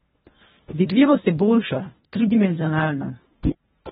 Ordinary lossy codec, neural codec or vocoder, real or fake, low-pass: AAC, 16 kbps; codec, 24 kHz, 1.5 kbps, HILCodec; fake; 10.8 kHz